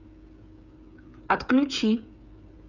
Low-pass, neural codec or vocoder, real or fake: 7.2 kHz; codec, 16 kHz, 8 kbps, FreqCodec, smaller model; fake